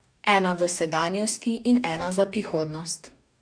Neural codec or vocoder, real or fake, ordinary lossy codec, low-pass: codec, 44.1 kHz, 2.6 kbps, DAC; fake; none; 9.9 kHz